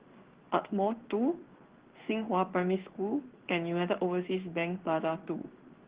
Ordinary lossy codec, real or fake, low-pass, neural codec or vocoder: Opus, 16 kbps; fake; 3.6 kHz; codec, 16 kHz in and 24 kHz out, 1 kbps, XY-Tokenizer